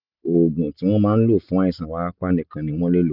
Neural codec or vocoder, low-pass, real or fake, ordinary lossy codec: none; 5.4 kHz; real; none